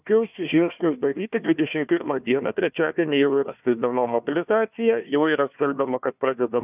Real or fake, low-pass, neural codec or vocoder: fake; 3.6 kHz; codec, 16 kHz, 1 kbps, FunCodec, trained on Chinese and English, 50 frames a second